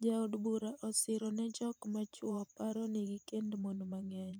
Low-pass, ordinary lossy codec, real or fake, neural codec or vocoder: none; none; fake; vocoder, 44.1 kHz, 128 mel bands every 512 samples, BigVGAN v2